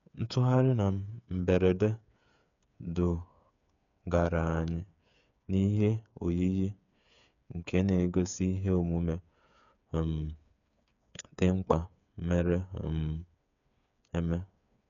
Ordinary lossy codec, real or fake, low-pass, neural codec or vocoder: none; fake; 7.2 kHz; codec, 16 kHz, 8 kbps, FreqCodec, smaller model